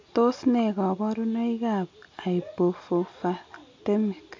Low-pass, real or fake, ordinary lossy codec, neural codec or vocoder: 7.2 kHz; real; MP3, 48 kbps; none